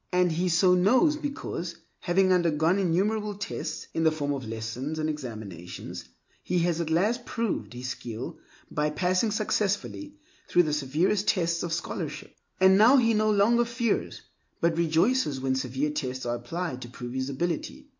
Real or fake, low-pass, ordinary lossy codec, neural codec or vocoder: real; 7.2 kHz; MP3, 48 kbps; none